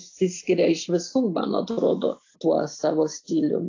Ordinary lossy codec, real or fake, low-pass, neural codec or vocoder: AAC, 48 kbps; real; 7.2 kHz; none